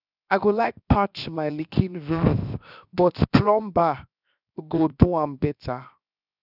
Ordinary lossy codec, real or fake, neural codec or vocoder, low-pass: none; fake; codec, 16 kHz, 0.7 kbps, FocalCodec; 5.4 kHz